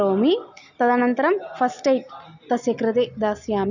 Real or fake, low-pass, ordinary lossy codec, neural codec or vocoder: real; 7.2 kHz; none; none